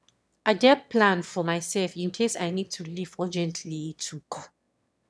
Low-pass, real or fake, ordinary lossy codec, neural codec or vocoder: none; fake; none; autoencoder, 22.05 kHz, a latent of 192 numbers a frame, VITS, trained on one speaker